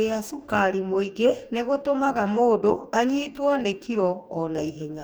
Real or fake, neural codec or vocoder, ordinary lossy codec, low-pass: fake; codec, 44.1 kHz, 2.6 kbps, DAC; none; none